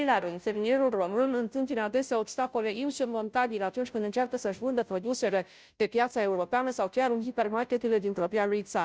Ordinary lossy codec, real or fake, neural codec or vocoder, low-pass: none; fake; codec, 16 kHz, 0.5 kbps, FunCodec, trained on Chinese and English, 25 frames a second; none